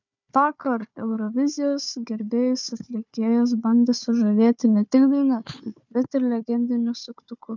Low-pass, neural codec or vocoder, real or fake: 7.2 kHz; codec, 16 kHz, 4 kbps, FunCodec, trained on Chinese and English, 50 frames a second; fake